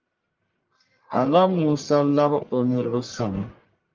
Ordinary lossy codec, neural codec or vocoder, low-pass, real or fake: Opus, 32 kbps; codec, 44.1 kHz, 1.7 kbps, Pupu-Codec; 7.2 kHz; fake